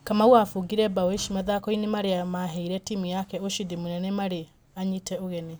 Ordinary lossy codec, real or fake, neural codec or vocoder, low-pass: none; real; none; none